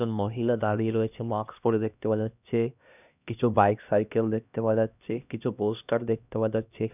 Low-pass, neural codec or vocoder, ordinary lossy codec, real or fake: 3.6 kHz; codec, 16 kHz, 1 kbps, X-Codec, HuBERT features, trained on LibriSpeech; none; fake